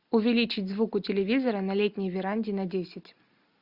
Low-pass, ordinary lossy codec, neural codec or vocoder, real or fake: 5.4 kHz; Opus, 64 kbps; none; real